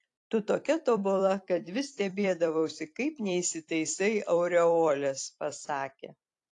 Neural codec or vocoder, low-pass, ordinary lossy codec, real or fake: vocoder, 44.1 kHz, 128 mel bands every 256 samples, BigVGAN v2; 10.8 kHz; AAC, 48 kbps; fake